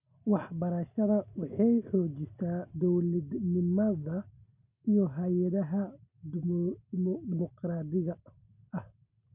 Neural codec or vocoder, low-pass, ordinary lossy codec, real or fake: none; 3.6 kHz; none; real